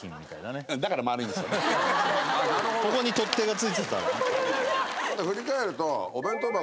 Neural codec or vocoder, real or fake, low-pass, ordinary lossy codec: none; real; none; none